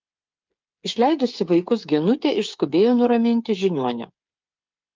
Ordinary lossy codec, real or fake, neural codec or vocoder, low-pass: Opus, 24 kbps; fake; codec, 16 kHz, 8 kbps, FreqCodec, smaller model; 7.2 kHz